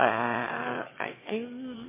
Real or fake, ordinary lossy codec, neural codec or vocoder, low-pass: fake; MP3, 16 kbps; autoencoder, 22.05 kHz, a latent of 192 numbers a frame, VITS, trained on one speaker; 3.6 kHz